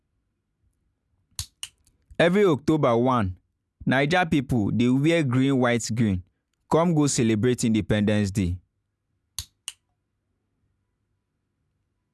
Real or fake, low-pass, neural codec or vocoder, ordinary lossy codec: real; none; none; none